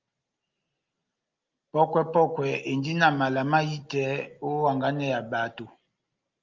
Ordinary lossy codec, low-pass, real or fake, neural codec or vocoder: Opus, 24 kbps; 7.2 kHz; real; none